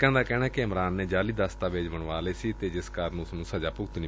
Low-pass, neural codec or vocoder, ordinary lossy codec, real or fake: none; none; none; real